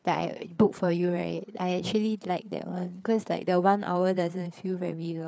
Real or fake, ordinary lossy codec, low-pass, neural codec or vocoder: fake; none; none; codec, 16 kHz, 4 kbps, FreqCodec, larger model